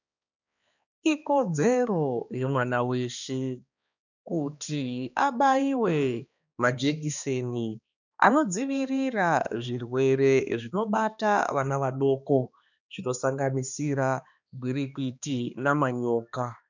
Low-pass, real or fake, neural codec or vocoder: 7.2 kHz; fake; codec, 16 kHz, 2 kbps, X-Codec, HuBERT features, trained on balanced general audio